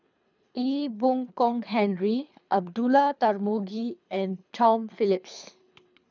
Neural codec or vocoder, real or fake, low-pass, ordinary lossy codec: codec, 24 kHz, 3 kbps, HILCodec; fake; 7.2 kHz; none